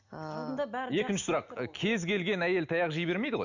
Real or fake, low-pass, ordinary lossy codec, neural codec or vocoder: real; 7.2 kHz; none; none